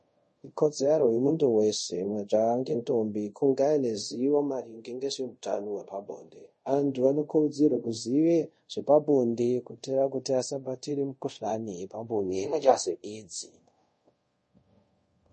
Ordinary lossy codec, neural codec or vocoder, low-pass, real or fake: MP3, 32 kbps; codec, 24 kHz, 0.5 kbps, DualCodec; 10.8 kHz; fake